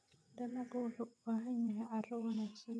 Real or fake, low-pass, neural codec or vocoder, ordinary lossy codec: fake; none; vocoder, 22.05 kHz, 80 mel bands, WaveNeXt; none